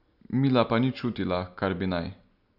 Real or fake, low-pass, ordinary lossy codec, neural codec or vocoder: real; 5.4 kHz; none; none